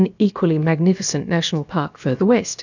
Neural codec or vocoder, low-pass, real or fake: codec, 16 kHz, about 1 kbps, DyCAST, with the encoder's durations; 7.2 kHz; fake